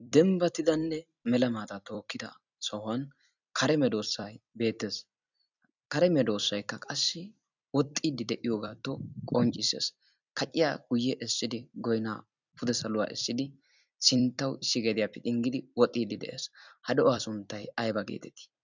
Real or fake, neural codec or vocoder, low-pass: fake; vocoder, 44.1 kHz, 128 mel bands every 256 samples, BigVGAN v2; 7.2 kHz